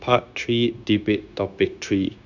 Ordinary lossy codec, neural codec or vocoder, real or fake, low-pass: none; codec, 16 kHz, 0.9 kbps, LongCat-Audio-Codec; fake; 7.2 kHz